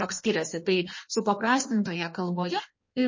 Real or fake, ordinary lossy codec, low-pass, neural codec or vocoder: fake; MP3, 32 kbps; 7.2 kHz; codec, 16 kHz in and 24 kHz out, 1.1 kbps, FireRedTTS-2 codec